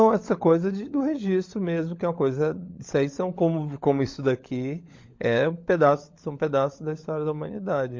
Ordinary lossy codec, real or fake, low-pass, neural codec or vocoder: MP3, 48 kbps; fake; 7.2 kHz; codec, 16 kHz, 16 kbps, FunCodec, trained on LibriTTS, 50 frames a second